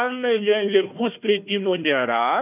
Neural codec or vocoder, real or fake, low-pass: codec, 16 kHz, 1 kbps, FunCodec, trained on Chinese and English, 50 frames a second; fake; 3.6 kHz